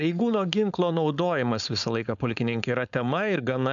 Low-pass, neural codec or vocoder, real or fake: 7.2 kHz; codec, 16 kHz, 4.8 kbps, FACodec; fake